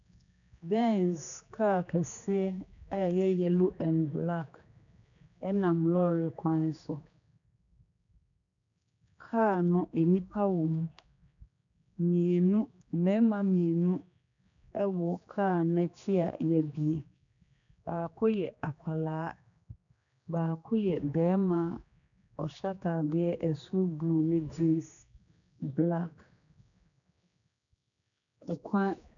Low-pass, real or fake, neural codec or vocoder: 7.2 kHz; fake; codec, 16 kHz, 2 kbps, X-Codec, HuBERT features, trained on general audio